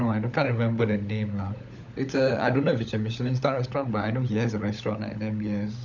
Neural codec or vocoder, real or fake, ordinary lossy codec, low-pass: codec, 16 kHz, 16 kbps, FunCodec, trained on LibriTTS, 50 frames a second; fake; none; 7.2 kHz